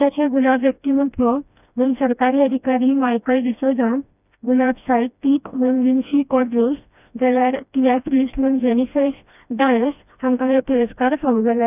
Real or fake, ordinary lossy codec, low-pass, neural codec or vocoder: fake; none; 3.6 kHz; codec, 16 kHz, 1 kbps, FreqCodec, smaller model